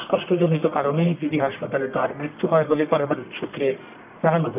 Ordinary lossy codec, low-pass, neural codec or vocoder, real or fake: none; 3.6 kHz; codec, 44.1 kHz, 1.7 kbps, Pupu-Codec; fake